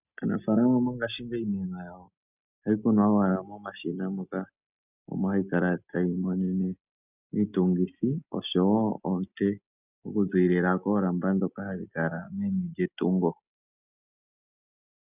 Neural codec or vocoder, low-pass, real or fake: none; 3.6 kHz; real